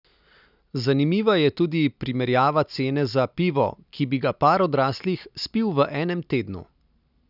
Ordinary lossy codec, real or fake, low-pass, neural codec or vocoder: none; real; 5.4 kHz; none